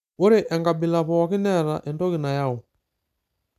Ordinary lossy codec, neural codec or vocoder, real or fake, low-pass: none; none; real; 14.4 kHz